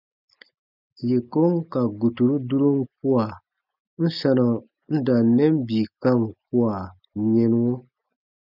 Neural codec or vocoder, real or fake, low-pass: none; real; 5.4 kHz